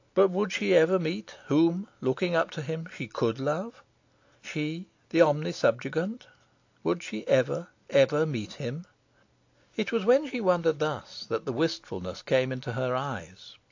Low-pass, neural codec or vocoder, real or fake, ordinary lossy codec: 7.2 kHz; none; real; AAC, 48 kbps